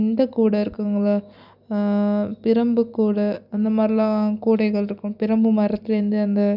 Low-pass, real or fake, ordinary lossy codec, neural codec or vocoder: 5.4 kHz; real; none; none